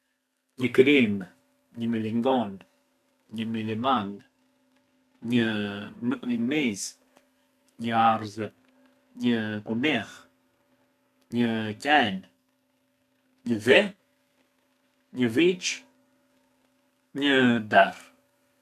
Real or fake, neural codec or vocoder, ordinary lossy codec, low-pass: fake; codec, 32 kHz, 1.9 kbps, SNAC; none; 14.4 kHz